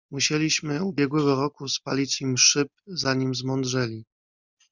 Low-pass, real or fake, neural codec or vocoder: 7.2 kHz; real; none